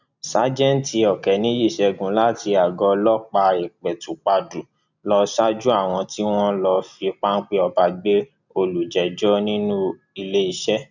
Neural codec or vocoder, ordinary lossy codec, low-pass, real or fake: none; none; 7.2 kHz; real